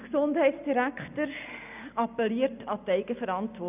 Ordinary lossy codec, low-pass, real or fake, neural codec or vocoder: none; 3.6 kHz; real; none